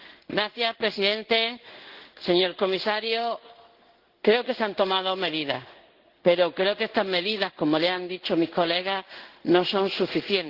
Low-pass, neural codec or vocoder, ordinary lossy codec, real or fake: 5.4 kHz; codec, 16 kHz in and 24 kHz out, 1 kbps, XY-Tokenizer; Opus, 16 kbps; fake